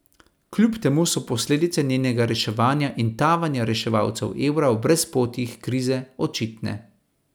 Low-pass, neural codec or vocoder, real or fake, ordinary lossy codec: none; none; real; none